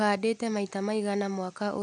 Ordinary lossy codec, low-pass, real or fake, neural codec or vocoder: none; 9.9 kHz; real; none